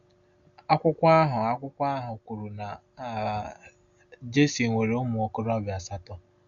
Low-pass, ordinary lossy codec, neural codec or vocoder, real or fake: 7.2 kHz; none; none; real